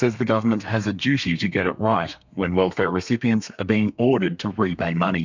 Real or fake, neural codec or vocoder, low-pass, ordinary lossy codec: fake; codec, 32 kHz, 1.9 kbps, SNAC; 7.2 kHz; MP3, 64 kbps